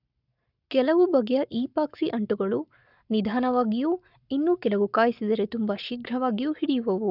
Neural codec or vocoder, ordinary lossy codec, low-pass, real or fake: codec, 44.1 kHz, 7.8 kbps, Pupu-Codec; none; 5.4 kHz; fake